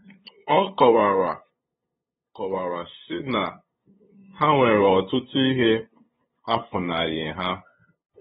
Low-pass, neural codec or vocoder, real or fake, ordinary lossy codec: 7.2 kHz; codec, 16 kHz, 8 kbps, FunCodec, trained on LibriTTS, 25 frames a second; fake; AAC, 16 kbps